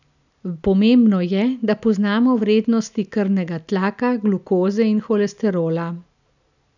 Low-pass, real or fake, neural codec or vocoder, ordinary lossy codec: 7.2 kHz; real; none; none